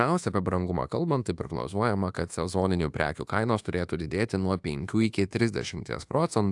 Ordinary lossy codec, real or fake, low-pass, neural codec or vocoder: AAC, 64 kbps; fake; 10.8 kHz; codec, 24 kHz, 1.2 kbps, DualCodec